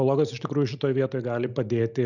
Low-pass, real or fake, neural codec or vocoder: 7.2 kHz; real; none